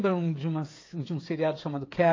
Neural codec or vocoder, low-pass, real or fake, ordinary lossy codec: codec, 16 kHz, 8 kbps, FreqCodec, smaller model; 7.2 kHz; fake; AAC, 32 kbps